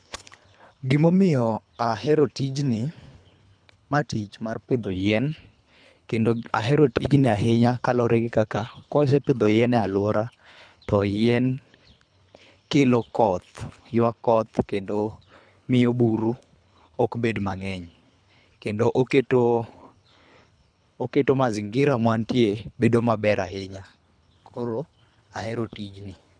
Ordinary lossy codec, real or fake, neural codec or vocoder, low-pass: none; fake; codec, 24 kHz, 3 kbps, HILCodec; 9.9 kHz